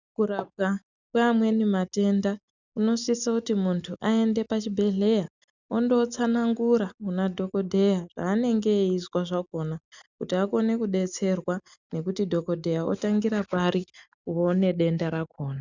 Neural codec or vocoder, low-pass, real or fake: none; 7.2 kHz; real